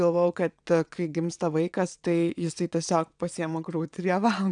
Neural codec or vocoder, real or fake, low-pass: vocoder, 22.05 kHz, 80 mel bands, WaveNeXt; fake; 9.9 kHz